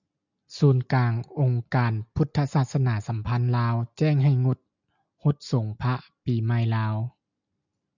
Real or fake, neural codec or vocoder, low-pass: real; none; 7.2 kHz